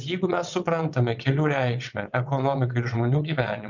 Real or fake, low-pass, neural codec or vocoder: fake; 7.2 kHz; vocoder, 22.05 kHz, 80 mel bands, WaveNeXt